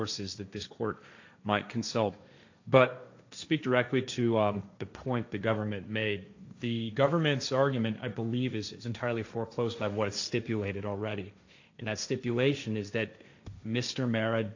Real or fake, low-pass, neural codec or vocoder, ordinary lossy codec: fake; 7.2 kHz; codec, 16 kHz, 1.1 kbps, Voila-Tokenizer; AAC, 48 kbps